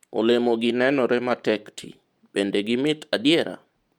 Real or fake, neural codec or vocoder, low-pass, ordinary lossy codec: real; none; 19.8 kHz; MP3, 96 kbps